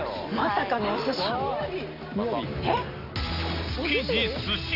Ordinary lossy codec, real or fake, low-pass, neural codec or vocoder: none; real; 5.4 kHz; none